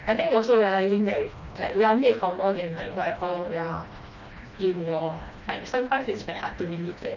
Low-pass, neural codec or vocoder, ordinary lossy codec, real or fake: 7.2 kHz; codec, 16 kHz, 1 kbps, FreqCodec, smaller model; none; fake